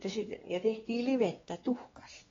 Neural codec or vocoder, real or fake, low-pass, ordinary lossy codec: codec, 16 kHz, 2 kbps, X-Codec, WavLM features, trained on Multilingual LibriSpeech; fake; 7.2 kHz; AAC, 24 kbps